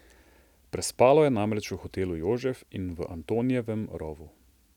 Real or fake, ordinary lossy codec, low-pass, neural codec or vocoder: real; none; 19.8 kHz; none